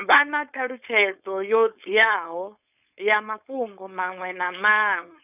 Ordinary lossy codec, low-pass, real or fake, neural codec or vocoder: none; 3.6 kHz; fake; codec, 16 kHz, 4.8 kbps, FACodec